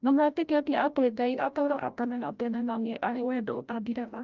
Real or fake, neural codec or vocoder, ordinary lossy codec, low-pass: fake; codec, 16 kHz, 0.5 kbps, FreqCodec, larger model; Opus, 32 kbps; 7.2 kHz